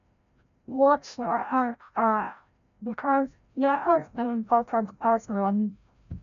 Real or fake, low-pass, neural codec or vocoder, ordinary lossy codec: fake; 7.2 kHz; codec, 16 kHz, 0.5 kbps, FreqCodec, larger model; none